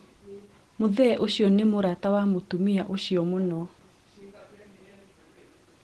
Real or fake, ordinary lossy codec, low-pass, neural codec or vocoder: real; Opus, 16 kbps; 10.8 kHz; none